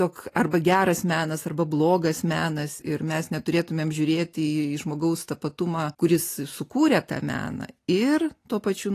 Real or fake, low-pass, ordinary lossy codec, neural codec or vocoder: real; 14.4 kHz; AAC, 48 kbps; none